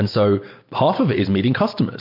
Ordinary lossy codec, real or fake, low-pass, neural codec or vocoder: MP3, 32 kbps; real; 5.4 kHz; none